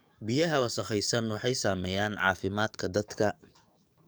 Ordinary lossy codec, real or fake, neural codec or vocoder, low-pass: none; fake; codec, 44.1 kHz, 7.8 kbps, DAC; none